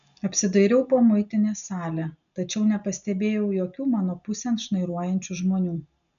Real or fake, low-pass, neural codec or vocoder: real; 7.2 kHz; none